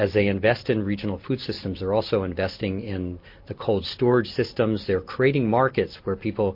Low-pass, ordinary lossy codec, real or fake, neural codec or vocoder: 5.4 kHz; MP3, 32 kbps; real; none